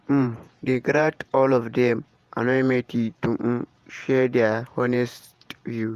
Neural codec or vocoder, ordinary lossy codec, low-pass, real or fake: vocoder, 48 kHz, 128 mel bands, Vocos; Opus, 32 kbps; 14.4 kHz; fake